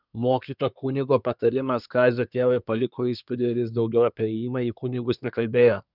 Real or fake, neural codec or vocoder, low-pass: fake; codec, 24 kHz, 1 kbps, SNAC; 5.4 kHz